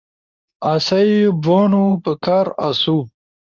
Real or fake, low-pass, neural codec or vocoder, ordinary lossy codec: fake; 7.2 kHz; codec, 24 kHz, 0.9 kbps, WavTokenizer, medium speech release version 2; AAC, 48 kbps